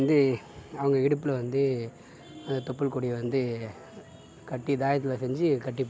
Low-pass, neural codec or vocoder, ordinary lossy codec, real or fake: none; none; none; real